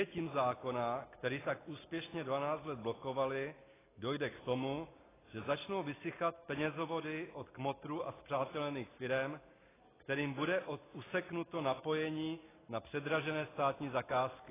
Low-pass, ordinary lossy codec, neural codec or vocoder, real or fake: 3.6 kHz; AAC, 16 kbps; none; real